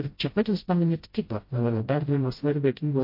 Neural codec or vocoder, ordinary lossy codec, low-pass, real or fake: codec, 16 kHz, 0.5 kbps, FreqCodec, smaller model; MP3, 32 kbps; 5.4 kHz; fake